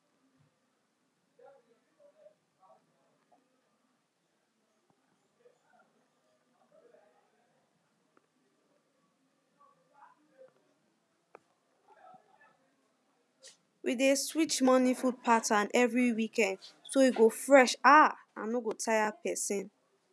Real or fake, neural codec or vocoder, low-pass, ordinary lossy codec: real; none; none; none